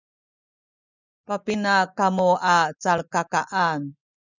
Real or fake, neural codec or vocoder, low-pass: real; none; 7.2 kHz